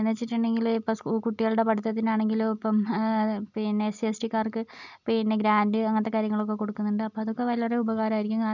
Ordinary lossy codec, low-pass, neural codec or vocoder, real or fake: none; 7.2 kHz; none; real